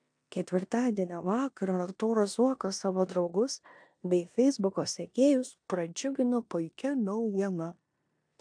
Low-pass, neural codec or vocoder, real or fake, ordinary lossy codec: 9.9 kHz; codec, 16 kHz in and 24 kHz out, 0.9 kbps, LongCat-Audio-Codec, four codebook decoder; fake; AAC, 64 kbps